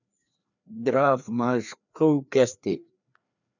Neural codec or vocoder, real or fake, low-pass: codec, 16 kHz, 2 kbps, FreqCodec, larger model; fake; 7.2 kHz